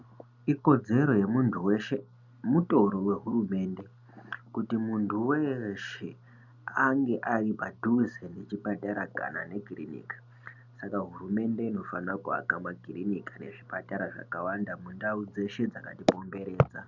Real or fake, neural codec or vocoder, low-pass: real; none; 7.2 kHz